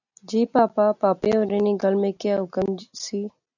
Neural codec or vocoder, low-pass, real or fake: none; 7.2 kHz; real